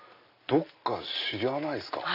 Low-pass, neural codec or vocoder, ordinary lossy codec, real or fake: 5.4 kHz; none; none; real